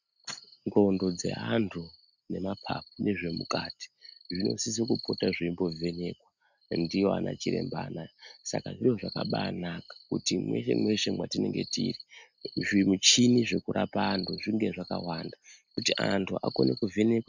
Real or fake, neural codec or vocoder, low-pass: real; none; 7.2 kHz